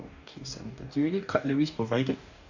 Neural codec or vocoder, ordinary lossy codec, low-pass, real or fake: codec, 44.1 kHz, 2.6 kbps, DAC; none; 7.2 kHz; fake